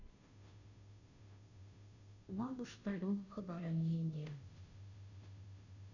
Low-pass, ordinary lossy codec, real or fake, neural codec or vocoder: 7.2 kHz; none; fake; codec, 16 kHz, 0.5 kbps, FunCodec, trained on Chinese and English, 25 frames a second